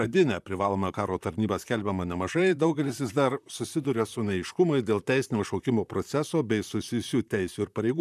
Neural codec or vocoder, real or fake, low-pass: vocoder, 44.1 kHz, 128 mel bands, Pupu-Vocoder; fake; 14.4 kHz